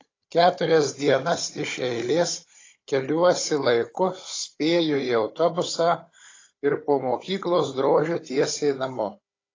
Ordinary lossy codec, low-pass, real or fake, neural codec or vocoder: AAC, 32 kbps; 7.2 kHz; fake; codec, 16 kHz, 16 kbps, FunCodec, trained on Chinese and English, 50 frames a second